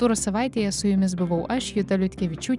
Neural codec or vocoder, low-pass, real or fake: vocoder, 44.1 kHz, 128 mel bands every 256 samples, BigVGAN v2; 10.8 kHz; fake